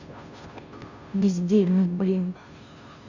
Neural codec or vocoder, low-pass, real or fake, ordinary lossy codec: codec, 16 kHz, 0.5 kbps, FunCodec, trained on Chinese and English, 25 frames a second; 7.2 kHz; fake; none